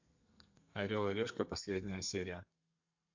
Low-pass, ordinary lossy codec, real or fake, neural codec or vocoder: 7.2 kHz; AAC, 48 kbps; fake; codec, 32 kHz, 1.9 kbps, SNAC